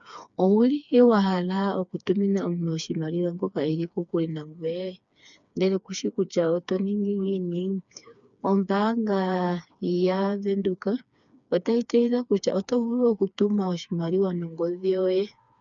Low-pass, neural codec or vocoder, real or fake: 7.2 kHz; codec, 16 kHz, 4 kbps, FreqCodec, smaller model; fake